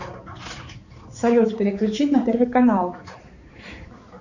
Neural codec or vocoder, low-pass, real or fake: codec, 16 kHz, 4 kbps, X-Codec, HuBERT features, trained on balanced general audio; 7.2 kHz; fake